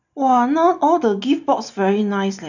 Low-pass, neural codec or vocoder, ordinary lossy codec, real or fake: 7.2 kHz; none; none; real